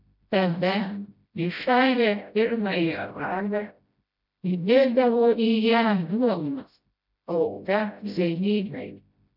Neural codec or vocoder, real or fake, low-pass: codec, 16 kHz, 0.5 kbps, FreqCodec, smaller model; fake; 5.4 kHz